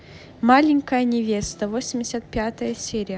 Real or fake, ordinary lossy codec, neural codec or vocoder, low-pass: real; none; none; none